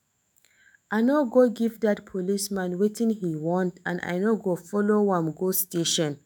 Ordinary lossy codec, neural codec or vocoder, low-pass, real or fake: none; autoencoder, 48 kHz, 128 numbers a frame, DAC-VAE, trained on Japanese speech; none; fake